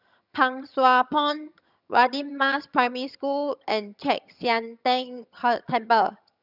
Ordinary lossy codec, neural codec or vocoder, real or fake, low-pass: none; vocoder, 22.05 kHz, 80 mel bands, HiFi-GAN; fake; 5.4 kHz